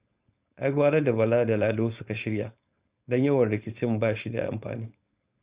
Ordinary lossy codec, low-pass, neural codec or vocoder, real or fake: Opus, 64 kbps; 3.6 kHz; codec, 16 kHz, 4.8 kbps, FACodec; fake